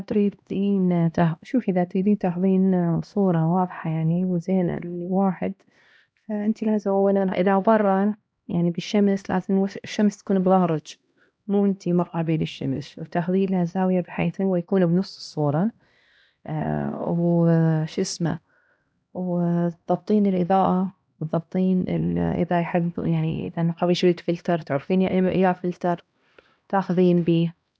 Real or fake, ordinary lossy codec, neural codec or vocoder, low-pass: fake; none; codec, 16 kHz, 1 kbps, X-Codec, HuBERT features, trained on LibriSpeech; none